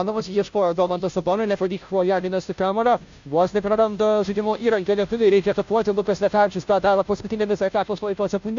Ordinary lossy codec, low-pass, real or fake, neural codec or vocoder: AAC, 64 kbps; 7.2 kHz; fake; codec, 16 kHz, 0.5 kbps, FunCodec, trained on Chinese and English, 25 frames a second